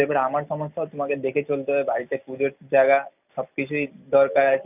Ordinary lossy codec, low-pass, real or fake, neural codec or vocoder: none; 3.6 kHz; real; none